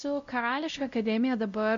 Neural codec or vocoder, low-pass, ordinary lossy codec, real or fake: codec, 16 kHz, 0.5 kbps, X-Codec, WavLM features, trained on Multilingual LibriSpeech; 7.2 kHz; AAC, 64 kbps; fake